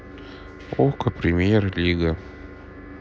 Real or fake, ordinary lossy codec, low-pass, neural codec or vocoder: real; none; none; none